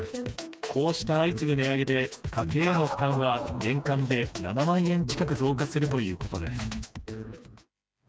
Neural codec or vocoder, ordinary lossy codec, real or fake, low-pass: codec, 16 kHz, 2 kbps, FreqCodec, smaller model; none; fake; none